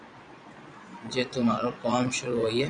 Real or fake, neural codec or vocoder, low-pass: fake; vocoder, 22.05 kHz, 80 mel bands, Vocos; 9.9 kHz